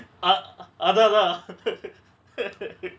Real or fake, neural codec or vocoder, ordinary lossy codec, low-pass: real; none; none; none